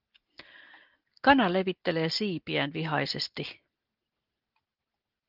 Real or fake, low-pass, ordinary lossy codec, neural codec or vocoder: real; 5.4 kHz; Opus, 32 kbps; none